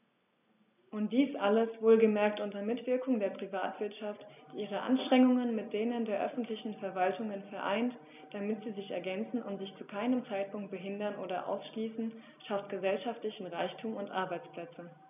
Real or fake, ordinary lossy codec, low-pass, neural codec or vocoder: real; none; 3.6 kHz; none